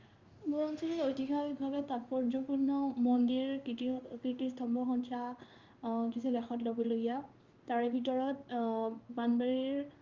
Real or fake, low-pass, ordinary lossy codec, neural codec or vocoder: fake; 7.2 kHz; Opus, 32 kbps; codec, 16 kHz in and 24 kHz out, 1 kbps, XY-Tokenizer